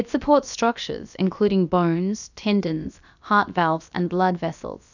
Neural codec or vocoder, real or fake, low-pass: codec, 16 kHz, about 1 kbps, DyCAST, with the encoder's durations; fake; 7.2 kHz